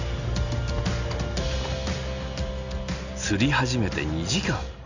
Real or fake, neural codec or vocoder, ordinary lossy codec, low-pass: real; none; Opus, 64 kbps; 7.2 kHz